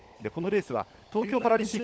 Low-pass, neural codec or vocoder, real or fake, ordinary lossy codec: none; codec, 16 kHz, 16 kbps, FunCodec, trained on LibriTTS, 50 frames a second; fake; none